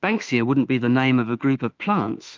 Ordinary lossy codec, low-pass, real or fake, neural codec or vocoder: Opus, 24 kbps; 7.2 kHz; fake; autoencoder, 48 kHz, 32 numbers a frame, DAC-VAE, trained on Japanese speech